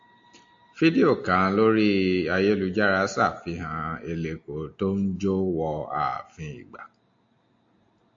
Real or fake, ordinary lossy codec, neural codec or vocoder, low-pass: real; AAC, 64 kbps; none; 7.2 kHz